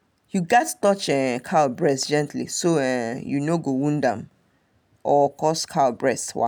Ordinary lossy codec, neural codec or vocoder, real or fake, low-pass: none; none; real; none